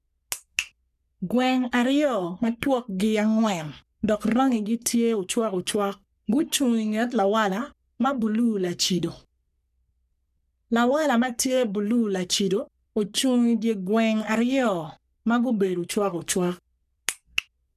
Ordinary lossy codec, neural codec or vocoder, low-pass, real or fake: none; codec, 44.1 kHz, 3.4 kbps, Pupu-Codec; 14.4 kHz; fake